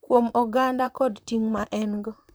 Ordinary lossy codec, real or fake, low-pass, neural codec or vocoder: none; fake; none; vocoder, 44.1 kHz, 128 mel bands, Pupu-Vocoder